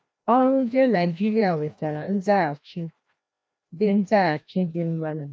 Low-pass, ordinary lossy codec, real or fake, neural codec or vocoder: none; none; fake; codec, 16 kHz, 1 kbps, FreqCodec, larger model